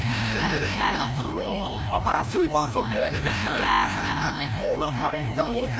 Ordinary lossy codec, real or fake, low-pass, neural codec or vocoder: none; fake; none; codec, 16 kHz, 0.5 kbps, FreqCodec, larger model